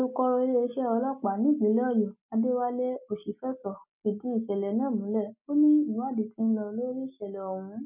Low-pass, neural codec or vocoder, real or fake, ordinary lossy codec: 3.6 kHz; none; real; none